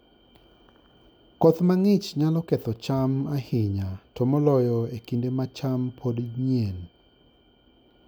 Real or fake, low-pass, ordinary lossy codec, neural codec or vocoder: real; none; none; none